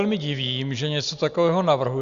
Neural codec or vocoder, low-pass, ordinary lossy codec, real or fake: none; 7.2 kHz; Opus, 64 kbps; real